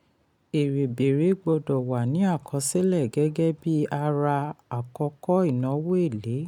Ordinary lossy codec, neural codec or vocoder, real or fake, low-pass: none; none; real; 19.8 kHz